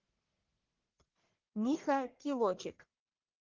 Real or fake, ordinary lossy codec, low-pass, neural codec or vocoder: fake; Opus, 16 kbps; 7.2 kHz; codec, 44.1 kHz, 1.7 kbps, Pupu-Codec